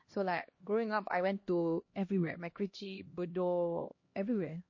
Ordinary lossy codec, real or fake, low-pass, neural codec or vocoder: MP3, 32 kbps; fake; 7.2 kHz; codec, 16 kHz, 1 kbps, X-Codec, HuBERT features, trained on LibriSpeech